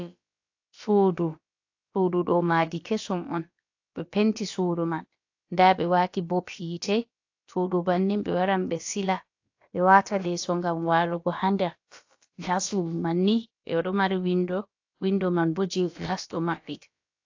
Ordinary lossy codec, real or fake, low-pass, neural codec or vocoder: AAC, 48 kbps; fake; 7.2 kHz; codec, 16 kHz, about 1 kbps, DyCAST, with the encoder's durations